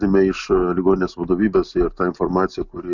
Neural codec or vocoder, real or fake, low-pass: none; real; 7.2 kHz